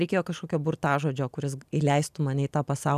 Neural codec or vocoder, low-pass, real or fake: none; 14.4 kHz; real